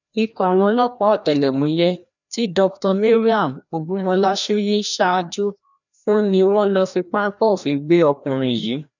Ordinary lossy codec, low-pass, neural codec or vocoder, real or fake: none; 7.2 kHz; codec, 16 kHz, 1 kbps, FreqCodec, larger model; fake